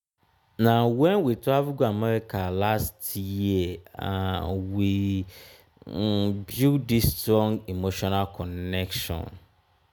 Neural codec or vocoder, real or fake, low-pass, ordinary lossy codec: none; real; none; none